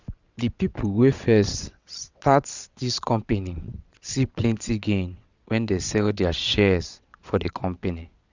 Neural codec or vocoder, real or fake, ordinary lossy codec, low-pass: vocoder, 22.05 kHz, 80 mel bands, WaveNeXt; fake; Opus, 64 kbps; 7.2 kHz